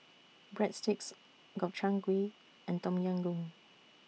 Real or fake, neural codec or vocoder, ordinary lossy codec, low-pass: real; none; none; none